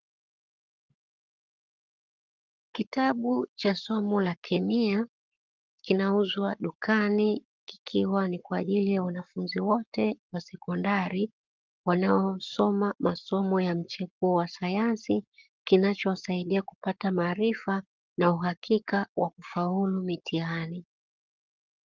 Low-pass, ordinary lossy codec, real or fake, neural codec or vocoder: 7.2 kHz; Opus, 32 kbps; fake; codec, 44.1 kHz, 7.8 kbps, Pupu-Codec